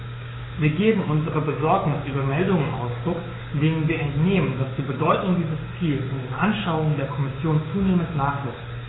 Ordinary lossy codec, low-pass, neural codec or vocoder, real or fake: AAC, 16 kbps; 7.2 kHz; codec, 16 kHz, 6 kbps, DAC; fake